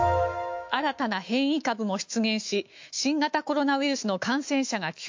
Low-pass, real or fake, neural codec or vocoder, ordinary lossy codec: 7.2 kHz; fake; autoencoder, 48 kHz, 128 numbers a frame, DAC-VAE, trained on Japanese speech; MP3, 48 kbps